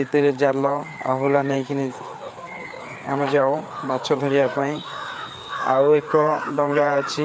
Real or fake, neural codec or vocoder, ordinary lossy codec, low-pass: fake; codec, 16 kHz, 4 kbps, FreqCodec, larger model; none; none